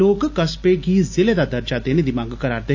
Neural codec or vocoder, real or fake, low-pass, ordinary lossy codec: none; real; 7.2 kHz; AAC, 48 kbps